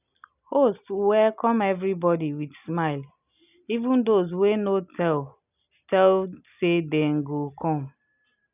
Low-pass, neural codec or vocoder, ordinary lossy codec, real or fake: 3.6 kHz; none; none; real